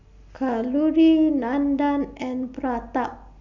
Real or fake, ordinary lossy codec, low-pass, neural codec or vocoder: real; none; 7.2 kHz; none